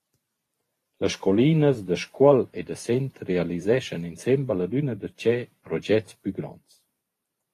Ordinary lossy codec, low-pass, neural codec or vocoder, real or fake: AAC, 48 kbps; 14.4 kHz; vocoder, 44.1 kHz, 128 mel bands every 512 samples, BigVGAN v2; fake